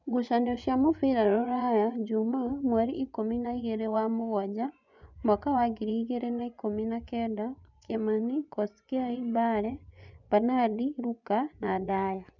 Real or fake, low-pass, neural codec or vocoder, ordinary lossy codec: fake; 7.2 kHz; vocoder, 24 kHz, 100 mel bands, Vocos; none